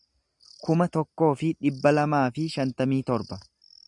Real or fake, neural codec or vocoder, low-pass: real; none; 10.8 kHz